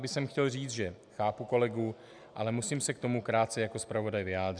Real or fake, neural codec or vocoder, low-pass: real; none; 9.9 kHz